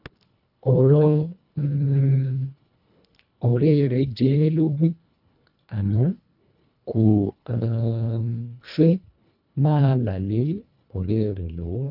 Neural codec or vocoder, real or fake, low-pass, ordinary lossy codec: codec, 24 kHz, 1.5 kbps, HILCodec; fake; 5.4 kHz; none